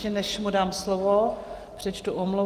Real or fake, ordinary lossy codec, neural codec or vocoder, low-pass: real; Opus, 32 kbps; none; 14.4 kHz